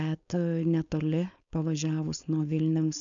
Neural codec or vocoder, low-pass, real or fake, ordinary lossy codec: codec, 16 kHz, 4.8 kbps, FACodec; 7.2 kHz; fake; MP3, 96 kbps